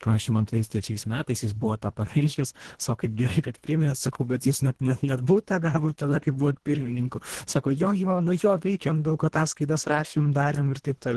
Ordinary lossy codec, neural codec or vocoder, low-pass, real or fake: Opus, 16 kbps; codec, 24 kHz, 1.5 kbps, HILCodec; 10.8 kHz; fake